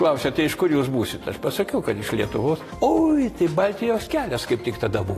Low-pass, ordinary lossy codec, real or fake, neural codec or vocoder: 14.4 kHz; AAC, 48 kbps; real; none